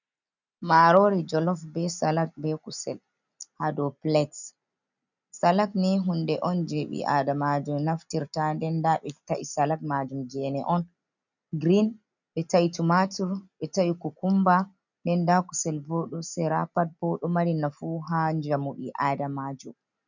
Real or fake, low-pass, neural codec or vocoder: real; 7.2 kHz; none